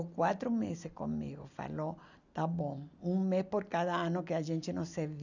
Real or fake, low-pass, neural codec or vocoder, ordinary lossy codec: real; 7.2 kHz; none; none